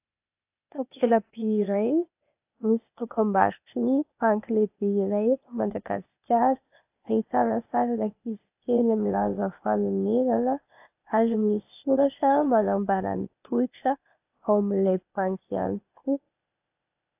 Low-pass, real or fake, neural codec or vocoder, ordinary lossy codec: 3.6 kHz; fake; codec, 16 kHz, 0.8 kbps, ZipCodec; AAC, 32 kbps